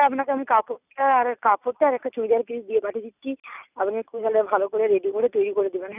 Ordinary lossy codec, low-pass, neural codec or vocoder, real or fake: none; 3.6 kHz; none; real